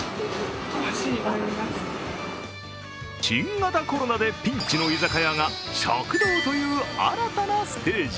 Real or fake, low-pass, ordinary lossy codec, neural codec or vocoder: real; none; none; none